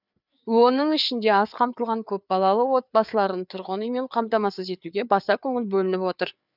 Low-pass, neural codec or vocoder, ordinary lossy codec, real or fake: 5.4 kHz; codec, 16 kHz, 4 kbps, FreqCodec, larger model; none; fake